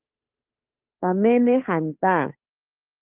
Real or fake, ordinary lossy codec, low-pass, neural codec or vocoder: fake; Opus, 32 kbps; 3.6 kHz; codec, 16 kHz, 2 kbps, FunCodec, trained on Chinese and English, 25 frames a second